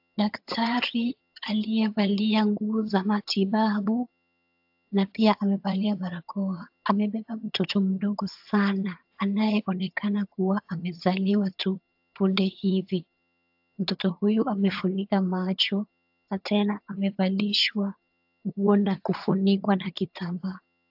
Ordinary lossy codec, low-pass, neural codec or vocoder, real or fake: AAC, 48 kbps; 5.4 kHz; vocoder, 22.05 kHz, 80 mel bands, HiFi-GAN; fake